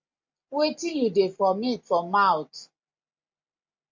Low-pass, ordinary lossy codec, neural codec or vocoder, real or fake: 7.2 kHz; MP3, 48 kbps; none; real